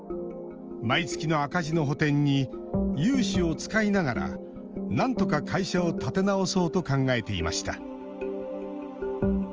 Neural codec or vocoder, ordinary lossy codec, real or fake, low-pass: none; Opus, 24 kbps; real; 7.2 kHz